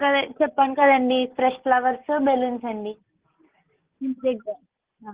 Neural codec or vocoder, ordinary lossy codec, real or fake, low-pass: none; Opus, 16 kbps; real; 3.6 kHz